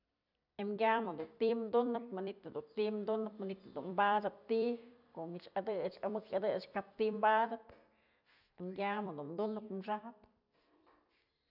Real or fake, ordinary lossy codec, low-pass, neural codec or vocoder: fake; none; 5.4 kHz; vocoder, 44.1 kHz, 128 mel bands every 256 samples, BigVGAN v2